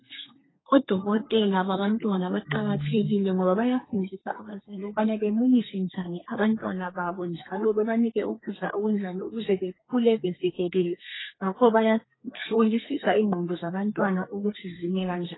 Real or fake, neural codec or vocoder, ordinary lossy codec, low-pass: fake; codec, 32 kHz, 1.9 kbps, SNAC; AAC, 16 kbps; 7.2 kHz